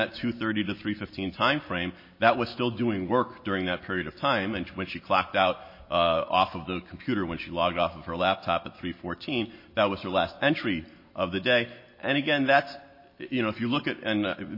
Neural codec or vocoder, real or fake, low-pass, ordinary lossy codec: none; real; 5.4 kHz; MP3, 24 kbps